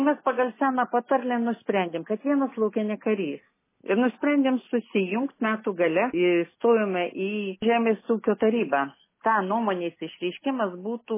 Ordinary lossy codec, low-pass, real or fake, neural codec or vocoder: MP3, 16 kbps; 3.6 kHz; real; none